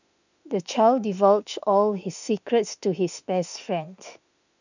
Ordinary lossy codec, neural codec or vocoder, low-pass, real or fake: none; autoencoder, 48 kHz, 32 numbers a frame, DAC-VAE, trained on Japanese speech; 7.2 kHz; fake